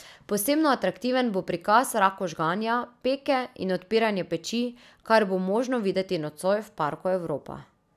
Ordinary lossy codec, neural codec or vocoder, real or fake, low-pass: none; none; real; 14.4 kHz